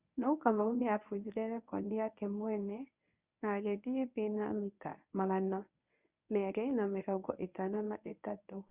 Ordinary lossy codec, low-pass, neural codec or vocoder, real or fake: Opus, 64 kbps; 3.6 kHz; codec, 24 kHz, 0.9 kbps, WavTokenizer, medium speech release version 1; fake